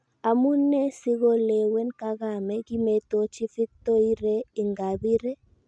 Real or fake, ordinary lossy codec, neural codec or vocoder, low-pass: real; none; none; 9.9 kHz